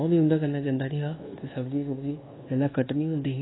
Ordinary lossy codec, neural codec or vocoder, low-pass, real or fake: AAC, 16 kbps; codec, 24 kHz, 1.2 kbps, DualCodec; 7.2 kHz; fake